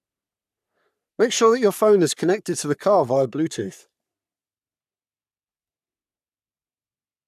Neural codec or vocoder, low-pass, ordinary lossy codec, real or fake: codec, 44.1 kHz, 3.4 kbps, Pupu-Codec; 14.4 kHz; none; fake